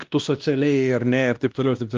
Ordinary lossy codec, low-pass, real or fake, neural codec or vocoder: Opus, 24 kbps; 7.2 kHz; fake; codec, 16 kHz, 1 kbps, X-Codec, WavLM features, trained on Multilingual LibriSpeech